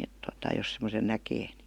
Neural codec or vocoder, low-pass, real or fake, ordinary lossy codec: none; 19.8 kHz; real; Opus, 64 kbps